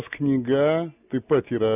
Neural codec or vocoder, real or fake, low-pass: none; real; 3.6 kHz